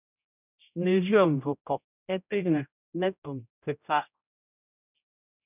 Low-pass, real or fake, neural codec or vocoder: 3.6 kHz; fake; codec, 16 kHz, 0.5 kbps, X-Codec, HuBERT features, trained on general audio